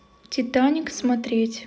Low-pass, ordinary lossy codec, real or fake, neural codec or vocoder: none; none; real; none